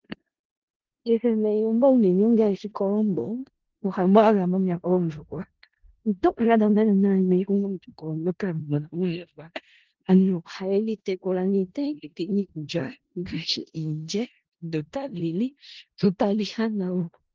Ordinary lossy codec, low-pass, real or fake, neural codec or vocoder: Opus, 16 kbps; 7.2 kHz; fake; codec, 16 kHz in and 24 kHz out, 0.4 kbps, LongCat-Audio-Codec, four codebook decoder